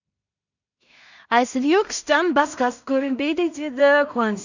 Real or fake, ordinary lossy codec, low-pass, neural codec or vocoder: fake; none; 7.2 kHz; codec, 16 kHz in and 24 kHz out, 0.4 kbps, LongCat-Audio-Codec, two codebook decoder